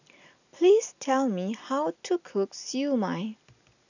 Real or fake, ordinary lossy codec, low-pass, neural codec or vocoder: real; none; 7.2 kHz; none